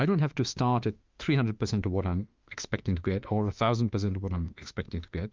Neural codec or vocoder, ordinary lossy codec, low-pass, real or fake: autoencoder, 48 kHz, 32 numbers a frame, DAC-VAE, trained on Japanese speech; Opus, 24 kbps; 7.2 kHz; fake